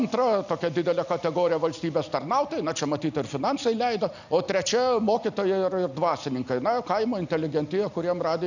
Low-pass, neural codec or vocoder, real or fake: 7.2 kHz; none; real